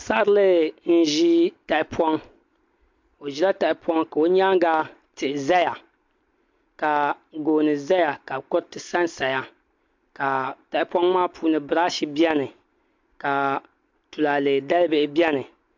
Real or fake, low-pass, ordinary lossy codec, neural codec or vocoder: real; 7.2 kHz; MP3, 48 kbps; none